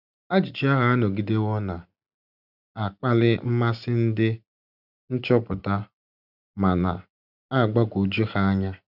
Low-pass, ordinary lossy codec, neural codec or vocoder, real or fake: 5.4 kHz; none; none; real